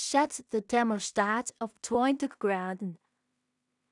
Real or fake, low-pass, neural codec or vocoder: fake; 10.8 kHz; codec, 16 kHz in and 24 kHz out, 0.4 kbps, LongCat-Audio-Codec, two codebook decoder